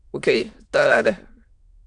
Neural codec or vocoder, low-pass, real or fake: autoencoder, 22.05 kHz, a latent of 192 numbers a frame, VITS, trained on many speakers; 9.9 kHz; fake